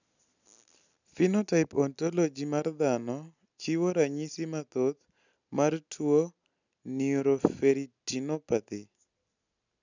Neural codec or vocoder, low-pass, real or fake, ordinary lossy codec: none; 7.2 kHz; real; none